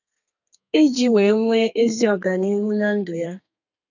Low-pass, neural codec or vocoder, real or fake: 7.2 kHz; codec, 32 kHz, 1.9 kbps, SNAC; fake